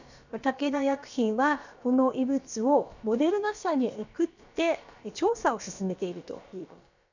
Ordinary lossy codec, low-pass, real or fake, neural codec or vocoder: none; 7.2 kHz; fake; codec, 16 kHz, about 1 kbps, DyCAST, with the encoder's durations